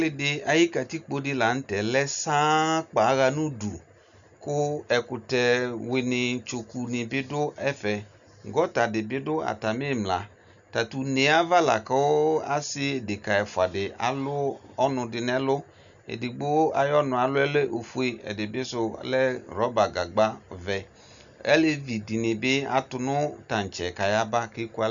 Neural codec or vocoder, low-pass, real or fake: none; 7.2 kHz; real